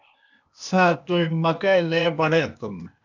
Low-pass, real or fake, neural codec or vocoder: 7.2 kHz; fake; codec, 16 kHz, 0.8 kbps, ZipCodec